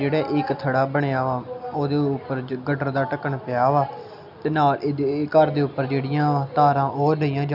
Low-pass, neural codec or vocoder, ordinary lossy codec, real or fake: 5.4 kHz; none; none; real